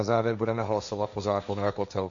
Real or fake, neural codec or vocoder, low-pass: fake; codec, 16 kHz, 1.1 kbps, Voila-Tokenizer; 7.2 kHz